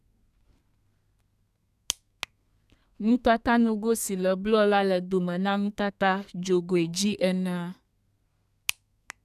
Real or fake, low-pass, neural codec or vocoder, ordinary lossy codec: fake; 14.4 kHz; codec, 32 kHz, 1.9 kbps, SNAC; none